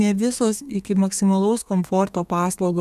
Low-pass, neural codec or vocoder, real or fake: 14.4 kHz; codec, 32 kHz, 1.9 kbps, SNAC; fake